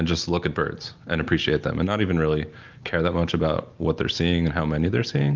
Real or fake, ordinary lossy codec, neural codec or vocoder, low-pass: real; Opus, 32 kbps; none; 7.2 kHz